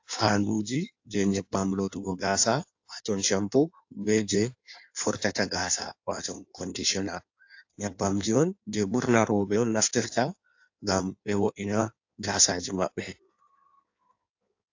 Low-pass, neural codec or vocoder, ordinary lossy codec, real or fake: 7.2 kHz; codec, 16 kHz in and 24 kHz out, 1.1 kbps, FireRedTTS-2 codec; AAC, 48 kbps; fake